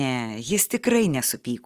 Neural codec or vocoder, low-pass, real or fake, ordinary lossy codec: none; 14.4 kHz; real; Opus, 24 kbps